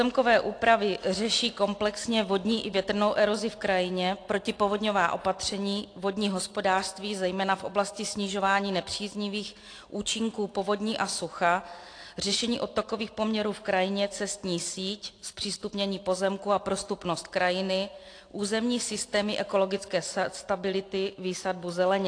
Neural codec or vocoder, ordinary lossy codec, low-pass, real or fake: none; AAC, 48 kbps; 9.9 kHz; real